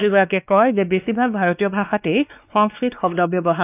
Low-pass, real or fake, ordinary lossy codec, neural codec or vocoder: 3.6 kHz; fake; none; codec, 16 kHz, 2 kbps, X-Codec, WavLM features, trained on Multilingual LibriSpeech